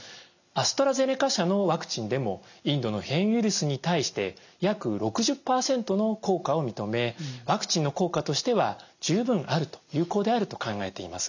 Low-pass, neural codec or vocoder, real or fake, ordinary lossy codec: 7.2 kHz; none; real; none